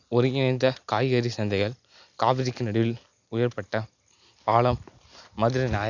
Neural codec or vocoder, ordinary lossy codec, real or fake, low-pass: none; none; real; 7.2 kHz